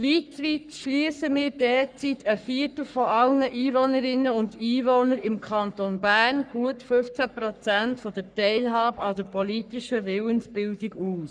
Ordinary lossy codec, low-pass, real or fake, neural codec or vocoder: none; 9.9 kHz; fake; codec, 44.1 kHz, 3.4 kbps, Pupu-Codec